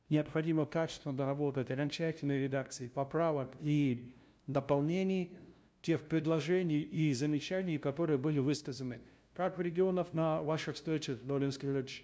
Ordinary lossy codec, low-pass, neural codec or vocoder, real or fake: none; none; codec, 16 kHz, 0.5 kbps, FunCodec, trained on LibriTTS, 25 frames a second; fake